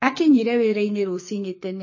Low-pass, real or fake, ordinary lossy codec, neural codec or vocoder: 7.2 kHz; fake; MP3, 32 kbps; codec, 44.1 kHz, 2.6 kbps, SNAC